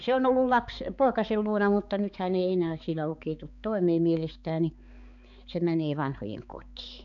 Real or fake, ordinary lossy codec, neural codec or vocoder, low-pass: fake; none; codec, 16 kHz, 4 kbps, X-Codec, HuBERT features, trained on balanced general audio; 7.2 kHz